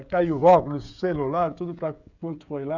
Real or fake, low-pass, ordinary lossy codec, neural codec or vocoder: fake; 7.2 kHz; none; codec, 16 kHz, 16 kbps, FreqCodec, smaller model